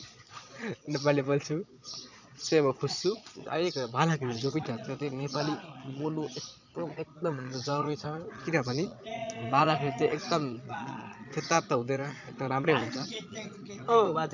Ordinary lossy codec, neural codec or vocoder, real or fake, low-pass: none; vocoder, 22.05 kHz, 80 mel bands, Vocos; fake; 7.2 kHz